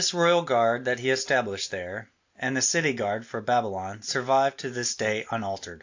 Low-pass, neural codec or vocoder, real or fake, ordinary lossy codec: 7.2 kHz; none; real; AAC, 48 kbps